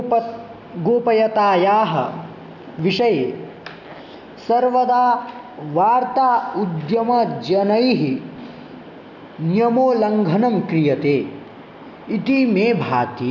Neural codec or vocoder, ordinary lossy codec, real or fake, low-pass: none; none; real; 7.2 kHz